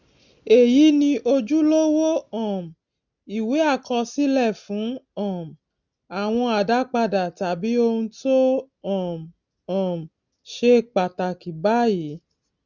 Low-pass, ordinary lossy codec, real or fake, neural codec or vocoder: 7.2 kHz; none; real; none